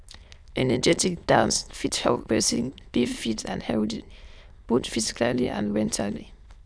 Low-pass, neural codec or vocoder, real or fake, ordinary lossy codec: none; autoencoder, 22.05 kHz, a latent of 192 numbers a frame, VITS, trained on many speakers; fake; none